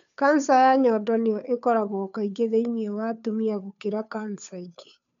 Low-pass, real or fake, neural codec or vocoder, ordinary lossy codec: 7.2 kHz; fake; codec, 16 kHz, 2 kbps, FunCodec, trained on Chinese and English, 25 frames a second; none